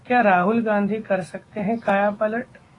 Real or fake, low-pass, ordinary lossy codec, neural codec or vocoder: fake; 10.8 kHz; AAC, 32 kbps; autoencoder, 48 kHz, 128 numbers a frame, DAC-VAE, trained on Japanese speech